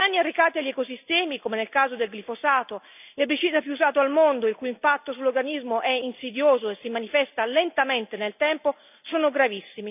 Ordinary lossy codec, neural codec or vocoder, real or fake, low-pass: none; none; real; 3.6 kHz